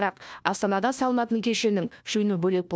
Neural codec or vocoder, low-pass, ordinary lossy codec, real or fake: codec, 16 kHz, 1 kbps, FunCodec, trained on LibriTTS, 50 frames a second; none; none; fake